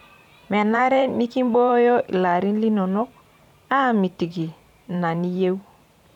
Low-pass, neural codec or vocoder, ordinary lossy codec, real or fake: 19.8 kHz; vocoder, 44.1 kHz, 128 mel bands every 512 samples, BigVGAN v2; none; fake